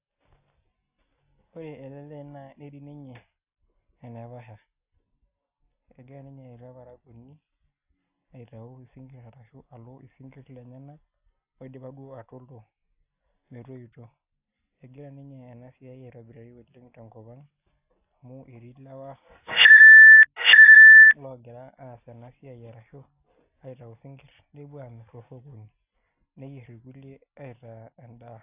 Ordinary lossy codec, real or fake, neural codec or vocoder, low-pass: AAC, 32 kbps; real; none; 3.6 kHz